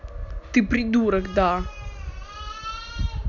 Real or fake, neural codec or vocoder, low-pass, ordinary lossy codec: real; none; 7.2 kHz; none